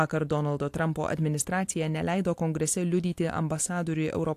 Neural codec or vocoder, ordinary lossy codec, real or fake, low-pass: none; AAC, 64 kbps; real; 14.4 kHz